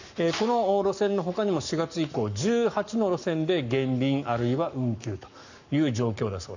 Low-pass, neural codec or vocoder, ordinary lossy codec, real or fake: 7.2 kHz; codec, 44.1 kHz, 7.8 kbps, Pupu-Codec; none; fake